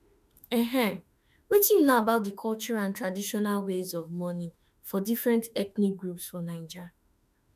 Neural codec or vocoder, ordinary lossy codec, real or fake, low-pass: autoencoder, 48 kHz, 32 numbers a frame, DAC-VAE, trained on Japanese speech; none; fake; 14.4 kHz